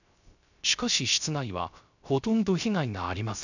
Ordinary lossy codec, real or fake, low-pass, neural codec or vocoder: none; fake; 7.2 kHz; codec, 16 kHz, 0.3 kbps, FocalCodec